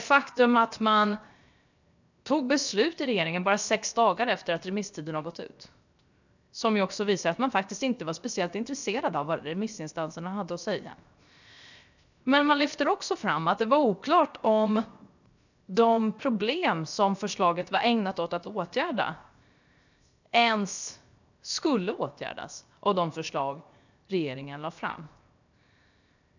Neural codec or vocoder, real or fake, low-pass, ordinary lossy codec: codec, 16 kHz, 0.7 kbps, FocalCodec; fake; 7.2 kHz; none